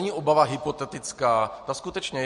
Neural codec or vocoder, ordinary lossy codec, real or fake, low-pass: none; MP3, 48 kbps; real; 14.4 kHz